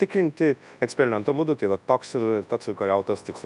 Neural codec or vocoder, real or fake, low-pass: codec, 24 kHz, 0.9 kbps, WavTokenizer, large speech release; fake; 10.8 kHz